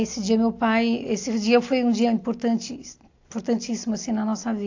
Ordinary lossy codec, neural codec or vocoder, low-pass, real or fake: AAC, 48 kbps; none; 7.2 kHz; real